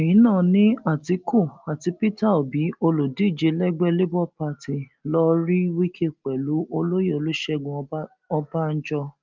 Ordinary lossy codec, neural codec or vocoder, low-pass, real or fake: Opus, 24 kbps; none; 7.2 kHz; real